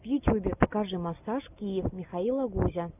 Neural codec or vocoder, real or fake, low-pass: none; real; 3.6 kHz